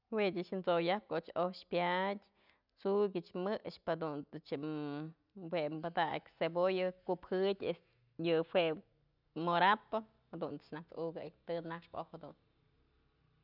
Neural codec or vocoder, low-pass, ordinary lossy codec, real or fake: none; 5.4 kHz; none; real